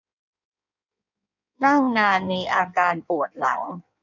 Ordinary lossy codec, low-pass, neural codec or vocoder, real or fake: none; 7.2 kHz; codec, 16 kHz in and 24 kHz out, 1.1 kbps, FireRedTTS-2 codec; fake